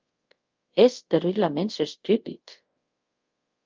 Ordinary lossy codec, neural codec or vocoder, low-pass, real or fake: Opus, 24 kbps; codec, 24 kHz, 0.5 kbps, DualCodec; 7.2 kHz; fake